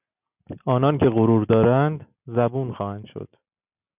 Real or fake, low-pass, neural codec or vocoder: real; 3.6 kHz; none